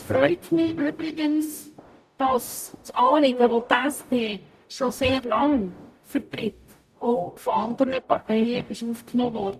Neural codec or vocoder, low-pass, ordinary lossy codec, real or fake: codec, 44.1 kHz, 0.9 kbps, DAC; 14.4 kHz; none; fake